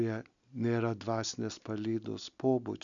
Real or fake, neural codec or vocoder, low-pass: real; none; 7.2 kHz